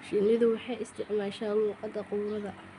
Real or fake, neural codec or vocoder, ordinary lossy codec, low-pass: real; none; none; 10.8 kHz